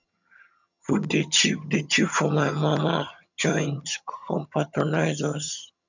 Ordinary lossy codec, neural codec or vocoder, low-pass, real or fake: none; vocoder, 22.05 kHz, 80 mel bands, HiFi-GAN; 7.2 kHz; fake